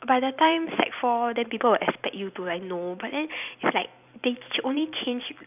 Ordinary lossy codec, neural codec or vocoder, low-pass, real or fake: none; vocoder, 44.1 kHz, 128 mel bands every 256 samples, BigVGAN v2; 3.6 kHz; fake